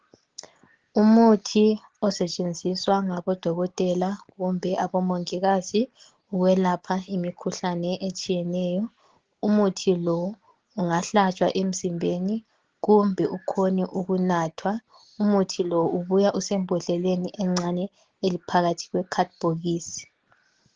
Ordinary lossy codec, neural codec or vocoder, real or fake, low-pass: Opus, 16 kbps; none; real; 7.2 kHz